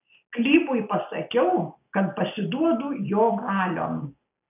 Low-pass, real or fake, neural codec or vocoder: 3.6 kHz; real; none